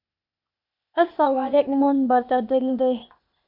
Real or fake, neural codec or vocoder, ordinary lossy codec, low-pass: fake; codec, 16 kHz, 0.8 kbps, ZipCodec; MP3, 48 kbps; 5.4 kHz